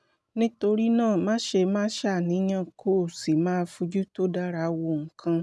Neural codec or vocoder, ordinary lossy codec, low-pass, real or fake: none; none; none; real